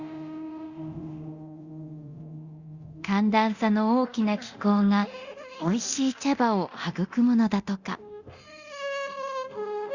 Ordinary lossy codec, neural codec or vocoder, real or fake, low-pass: Opus, 64 kbps; codec, 24 kHz, 0.9 kbps, DualCodec; fake; 7.2 kHz